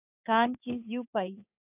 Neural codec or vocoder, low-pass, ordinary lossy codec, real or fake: codec, 16 kHz in and 24 kHz out, 1 kbps, XY-Tokenizer; 3.6 kHz; AAC, 24 kbps; fake